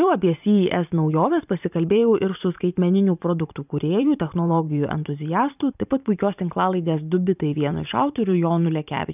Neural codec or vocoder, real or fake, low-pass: vocoder, 44.1 kHz, 128 mel bands every 512 samples, BigVGAN v2; fake; 3.6 kHz